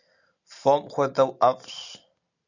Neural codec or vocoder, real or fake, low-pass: none; real; 7.2 kHz